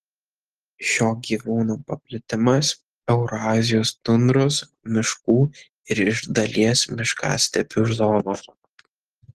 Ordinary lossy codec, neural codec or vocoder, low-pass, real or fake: Opus, 16 kbps; none; 14.4 kHz; real